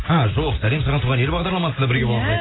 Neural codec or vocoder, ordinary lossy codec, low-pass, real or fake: none; AAC, 16 kbps; 7.2 kHz; real